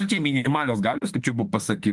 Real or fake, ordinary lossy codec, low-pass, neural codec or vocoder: fake; Opus, 24 kbps; 10.8 kHz; autoencoder, 48 kHz, 32 numbers a frame, DAC-VAE, trained on Japanese speech